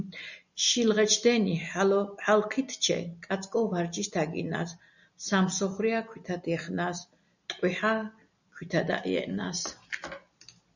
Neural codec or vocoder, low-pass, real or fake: none; 7.2 kHz; real